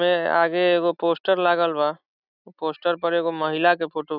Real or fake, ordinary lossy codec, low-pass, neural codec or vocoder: real; none; 5.4 kHz; none